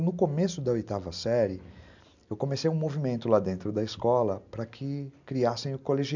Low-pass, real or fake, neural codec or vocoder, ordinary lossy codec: 7.2 kHz; real; none; none